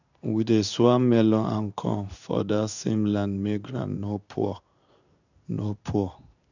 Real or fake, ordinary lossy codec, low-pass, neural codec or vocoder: fake; none; 7.2 kHz; codec, 16 kHz in and 24 kHz out, 1 kbps, XY-Tokenizer